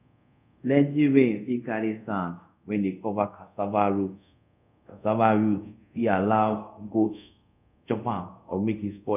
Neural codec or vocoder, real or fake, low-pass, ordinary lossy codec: codec, 24 kHz, 0.5 kbps, DualCodec; fake; 3.6 kHz; none